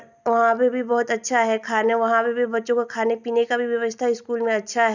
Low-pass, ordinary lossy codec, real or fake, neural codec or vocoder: 7.2 kHz; none; real; none